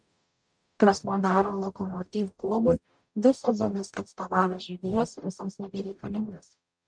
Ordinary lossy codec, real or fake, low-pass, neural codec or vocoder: MP3, 64 kbps; fake; 9.9 kHz; codec, 44.1 kHz, 0.9 kbps, DAC